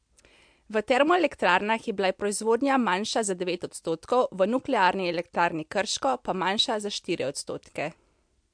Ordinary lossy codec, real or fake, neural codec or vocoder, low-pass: MP3, 64 kbps; real; none; 9.9 kHz